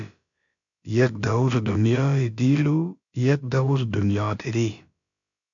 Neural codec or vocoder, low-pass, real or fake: codec, 16 kHz, about 1 kbps, DyCAST, with the encoder's durations; 7.2 kHz; fake